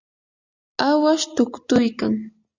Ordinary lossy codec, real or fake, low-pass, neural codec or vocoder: Opus, 64 kbps; real; 7.2 kHz; none